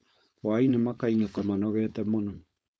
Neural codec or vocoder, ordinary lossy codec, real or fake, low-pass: codec, 16 kHz, 4.8 kbps, FACodec; none; fake; none